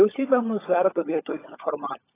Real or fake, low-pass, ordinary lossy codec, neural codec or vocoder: fake; 3.6 kHz; AAC, 16 kbps; codec, 16 kHz, 16 kbps, FunCodec, trained on LibriTTS, 50 frames a second